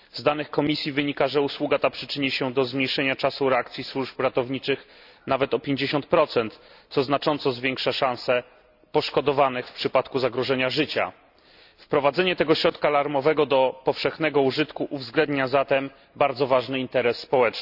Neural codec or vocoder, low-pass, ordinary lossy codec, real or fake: none; 5.4 kHz; none; real